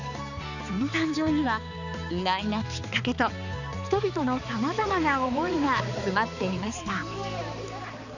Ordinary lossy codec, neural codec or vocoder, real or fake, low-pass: none; codec, 16 kHz, 4 kbps, X-Codec, HuBERT features, trained on balanced general audio; fake; 7.2 kHz